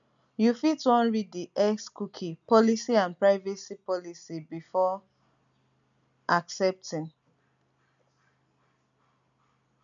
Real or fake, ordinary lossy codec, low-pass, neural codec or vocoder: real; MP3, 96 kbps; 7.2 kHz; none